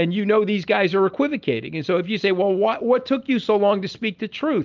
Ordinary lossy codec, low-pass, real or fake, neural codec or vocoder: Opus, 24 kbps; 7.2 kHz; real; none